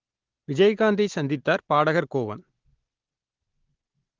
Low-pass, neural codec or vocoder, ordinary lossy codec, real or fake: 7.2 kHz; none; Opus, 16 kbps; real